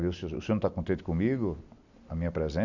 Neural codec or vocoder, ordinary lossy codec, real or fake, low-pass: none; none; real; 7.2 kHz